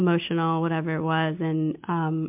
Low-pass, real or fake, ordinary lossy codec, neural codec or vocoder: 3.6 kHz; real; MP3, 32 kbps; none